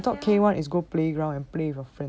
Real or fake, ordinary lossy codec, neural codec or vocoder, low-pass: real; none; none; none